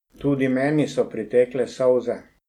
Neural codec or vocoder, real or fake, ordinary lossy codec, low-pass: none; real; MP3, 96 kbps; 19.8 kHz